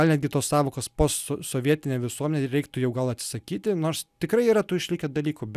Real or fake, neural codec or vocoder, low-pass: real; none; 14.4 kHz